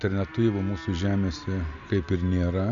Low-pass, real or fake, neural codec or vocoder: 7.2 kHz; real; none